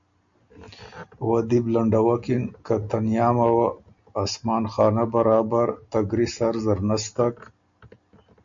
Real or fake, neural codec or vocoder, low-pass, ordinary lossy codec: real; none; 7.2 kHz; MP3, 64 kbps